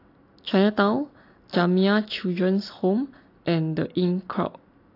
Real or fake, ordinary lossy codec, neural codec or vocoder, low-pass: real; AAC, 32 kbps; none; 5.4 kHz